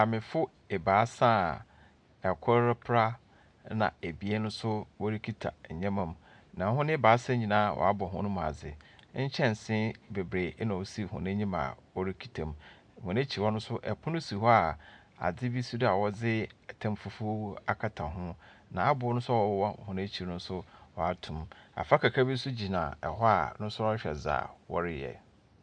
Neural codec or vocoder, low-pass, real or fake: none; 9.9 kHz; real